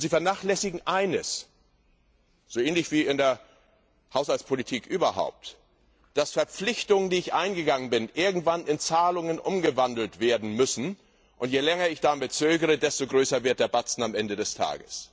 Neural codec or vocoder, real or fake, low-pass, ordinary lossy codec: none; real; none; none